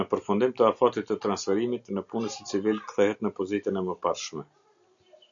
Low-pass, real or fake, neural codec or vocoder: 7.2 kHz; real; none